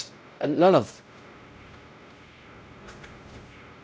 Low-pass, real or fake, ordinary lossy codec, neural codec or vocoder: none; fake; none; codec, 16 kHz, 0.5 kbps, X-Codec, WavLM features, trained on Multilingual LibriSpeech